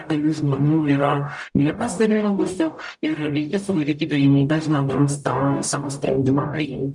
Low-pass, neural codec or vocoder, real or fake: 10.8 kHz; codec, 44.1 kHz, 0.9 kbps, DAC; fake